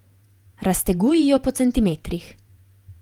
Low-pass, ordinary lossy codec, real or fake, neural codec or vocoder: 19.8 kHz; Opus, 32 kbps; fake; vocoder, 48 kHz, 128 mel bands, Vocos